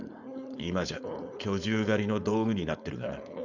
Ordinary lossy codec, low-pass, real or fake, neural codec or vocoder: none; 7.2 kHz; fake; codec, 16 kHz, 4.8 kbps, FACodec